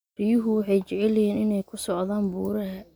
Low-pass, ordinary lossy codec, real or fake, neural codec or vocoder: none; none; real; none